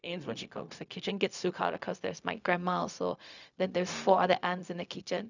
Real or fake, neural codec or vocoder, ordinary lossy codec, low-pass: fake; codec, 16 kHz, 0.4 kbps, LongCat-Audio-Codec; none; 7.2 kHz